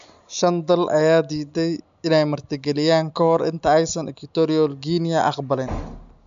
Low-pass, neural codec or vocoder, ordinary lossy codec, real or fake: 7.2 kHz; none; MP3, 64 kbps; real